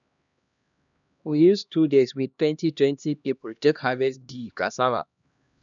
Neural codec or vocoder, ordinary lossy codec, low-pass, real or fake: codec, 16 kHz, 1 kbps, X-Codec, HuBERT features, trained on LibriSpeech; none; 7.2 kHz; fake